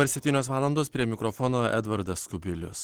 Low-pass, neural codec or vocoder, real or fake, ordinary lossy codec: 19.8 kHz; none; real; Opus, 16 kbps